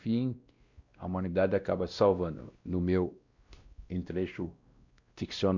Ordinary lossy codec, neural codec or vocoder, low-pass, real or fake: Opus, 64 kbps; codec, 16 kHz, 1 kbps, X-Codec, WavLM features, trained on Multilingual LibriSpeech; 7.2 kHz; fake